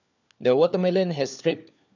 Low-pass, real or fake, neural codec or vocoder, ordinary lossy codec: 7.2 kHz; fake; codec, 16 kHz, 4 kbps, FunCodec, trained on LibriTTS, 50 frames a second; none